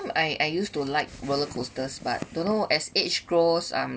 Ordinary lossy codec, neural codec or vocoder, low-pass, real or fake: none; none; none; real